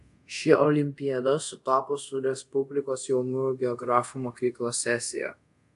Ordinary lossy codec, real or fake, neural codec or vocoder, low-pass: AAC, 64 kbps; fake; codec, 24 kHz, 0.5 kbps, DualCodec; 10.8 kHz